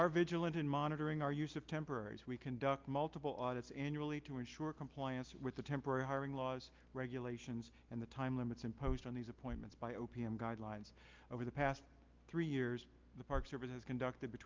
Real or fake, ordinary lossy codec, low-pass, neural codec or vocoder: real; Opus, 24 kbps; 7.2 kHz; none